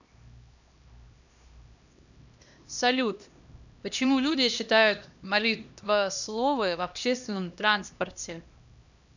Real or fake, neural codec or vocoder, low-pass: fake; codec, 16 kHz, 1 kbps, X-Codec, HuBERT features, trained on LibriSpeech; 7.2 kHz